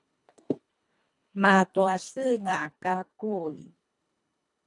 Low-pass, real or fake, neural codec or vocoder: 10.8 kHz; fake; codec, 24 kHz, 1.5 kbps, HILCodec